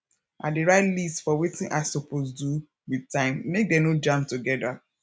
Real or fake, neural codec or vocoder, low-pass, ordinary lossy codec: real; none; none; none